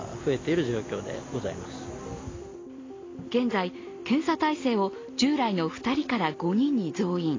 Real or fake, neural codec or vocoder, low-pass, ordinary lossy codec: real; none; 7.2 kHz; AAC, 32 kbps